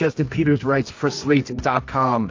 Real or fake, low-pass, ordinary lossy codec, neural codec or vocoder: fake; 7.2 kHz; AAC, 48 kbps; codec, 24 kHz, 0.9 kbps, WavTokenizer, medium music audio release